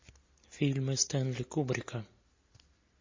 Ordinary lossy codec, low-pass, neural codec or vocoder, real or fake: MP3, 32 kbps; 7.2 kHz; none; real